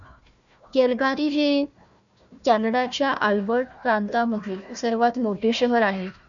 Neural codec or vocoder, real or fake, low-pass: codec, 16 kHz, 1 kbps, FunCodec, trained on Chinese and English, 50 frames a second; fake; 7.2 kHz